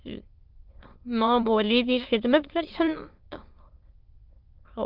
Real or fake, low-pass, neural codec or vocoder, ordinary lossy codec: fake; 5.4 kHz; autoencoder, 22.05 kHz, a latent of 192 numbers a frame, VITS, trained on many speakers; Opus, 24 kbps